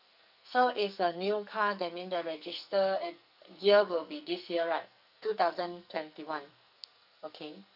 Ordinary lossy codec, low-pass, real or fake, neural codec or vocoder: none; 5.4 kHz; fake; codec, 44.1 kHz, 2.6 kbps, SNAC